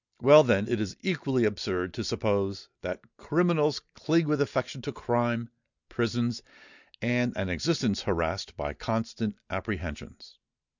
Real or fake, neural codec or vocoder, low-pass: real; none; 7.2 kHz